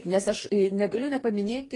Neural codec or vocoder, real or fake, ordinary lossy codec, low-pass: codec, 44.1 kHz, 2.6 kbps, DAC; fake; AAC, 32 kbps; 10.8 kHz